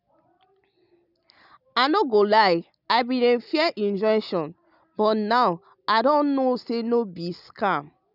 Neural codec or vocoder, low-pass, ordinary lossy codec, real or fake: none; 5.4 kHz; none; real